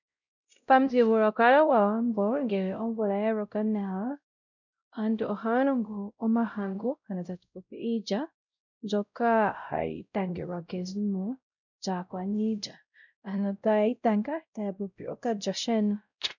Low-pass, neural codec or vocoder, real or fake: 7.2 kHz; codec, 16 kHz, 0.5 kbps, X-Codec, WavLM features, trained on Multilingual LibriSpeech; fake